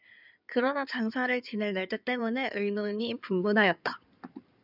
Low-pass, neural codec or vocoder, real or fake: 5.4 kHz; codec, 16 kHz in and 24 kHz out, 2.2 kbps, FireRedTTS-2 codec; fake